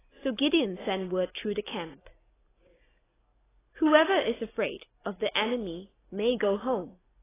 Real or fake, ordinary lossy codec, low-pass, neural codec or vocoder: real; AAC, 16 kbps; 3.6 kHz; none